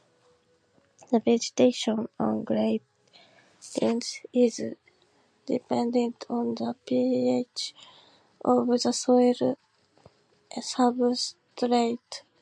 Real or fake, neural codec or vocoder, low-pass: real; none; 9.9 kHz